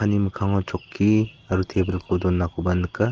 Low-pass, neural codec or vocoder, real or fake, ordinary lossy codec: 7.2 kHz; none; real; Opus, 16 kbps